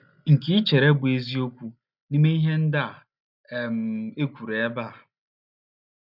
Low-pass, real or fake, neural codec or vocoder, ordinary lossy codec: 5.4 kHz; real; none; none